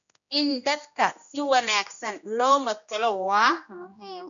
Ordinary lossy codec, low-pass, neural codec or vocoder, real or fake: MP3, 96 kbps; 7.2 kHz; codec, 16 kHz, 1 kbps, X-Codec, HuBERT features, trained on general audio; fake